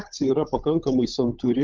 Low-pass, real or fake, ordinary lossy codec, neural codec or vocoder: 7.2 kHz; fake; Opus, 32 kbps; codec, 16 kHz, 16 kbps, FunCodec, trained on LibriTTS, 50 frames a second